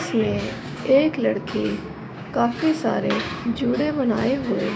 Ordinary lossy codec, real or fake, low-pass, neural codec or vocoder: none; fake; none; codec, 16 kHz, 6 kbps, DAC